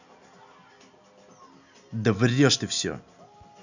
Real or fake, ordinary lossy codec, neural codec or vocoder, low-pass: real; none; none; 7.2 kHz